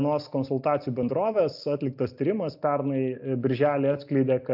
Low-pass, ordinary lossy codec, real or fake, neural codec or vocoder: 5.4 kHz; AAC, 48 kbps; real; none